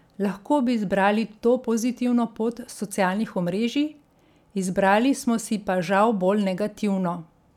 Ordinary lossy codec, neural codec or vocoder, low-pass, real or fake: none; none; 19.8 kHz; real